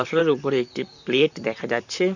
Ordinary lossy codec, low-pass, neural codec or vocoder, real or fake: none; 7.2 kHz; vocoder, 44.1 kHz, 128 mel bands, Pupu-Vocoder; fake